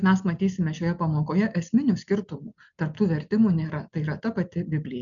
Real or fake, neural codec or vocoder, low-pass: real; none; 7.2 kHz